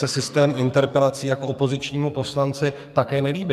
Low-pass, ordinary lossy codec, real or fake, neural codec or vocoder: 14.4 kHz; MP3, 96 kbps; fake; codec, 44.1 kHz, 2.6 kbps, SNAC